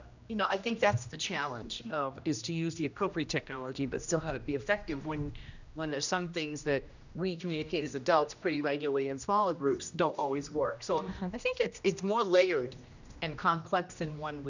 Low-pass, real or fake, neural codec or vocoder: 7.2 kHz; fake; codec, 16 kHz, 1 kbps, X-Codec, HuBERT features, trained on general audio